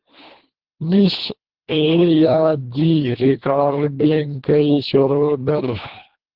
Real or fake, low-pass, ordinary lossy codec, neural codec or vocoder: fake; 5.4 kHz; Opus, 16 kbps; codec, 24 kHz, 1.5 kbps, HILCodec